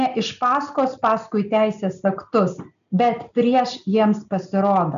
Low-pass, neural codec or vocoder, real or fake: 7.2 kHz; none; real